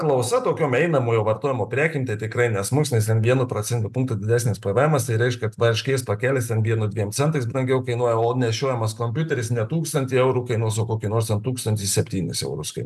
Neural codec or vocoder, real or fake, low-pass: none; real; 14.4 kHz